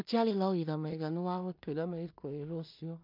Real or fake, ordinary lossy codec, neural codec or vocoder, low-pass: fake; MP3, 48 kbps; codec, 16 kHz in and 24 kHz out, 0.4 kbps, LongCat-Audio-Codec, two codebook decoder; 5.4 kHz